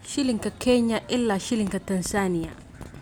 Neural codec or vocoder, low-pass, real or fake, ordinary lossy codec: none; none; real; none